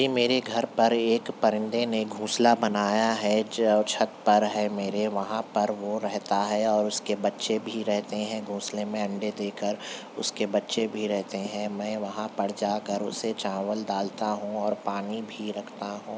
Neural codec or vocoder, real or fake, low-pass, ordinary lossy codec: none; real; none; none